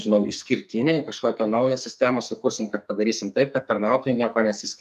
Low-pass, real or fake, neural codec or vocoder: 14.4 kHz; fake; codec, 44.1 kHz, 2.6 kbps, SNAC